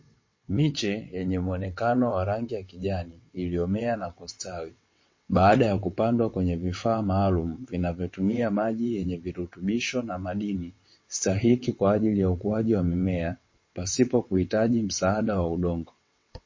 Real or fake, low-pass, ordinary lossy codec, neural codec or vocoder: fake; 7.2 kHz; MP3, 32 kbps; vocoder, 22.05 kHz, 80 mel bands, WaveNeXt